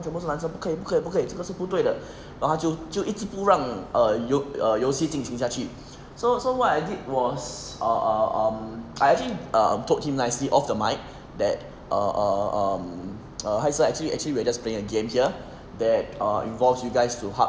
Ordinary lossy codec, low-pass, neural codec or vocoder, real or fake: none; none; none; real